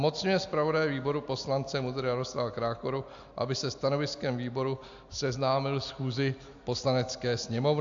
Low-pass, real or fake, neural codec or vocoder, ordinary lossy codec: 7.2 kHz; real; none; AAC, 64 kbps